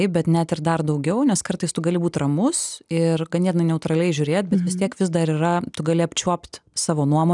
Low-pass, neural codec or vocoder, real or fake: 10.8 kHz; none; real